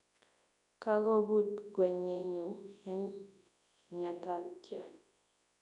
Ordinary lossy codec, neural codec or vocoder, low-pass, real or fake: none; codec, 24 kHz, 0.9 kbps, WavTokenizer, large speech release; 10.8 kHz; fake